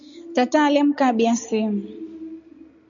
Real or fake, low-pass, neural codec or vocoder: real; 7.2 kHz; none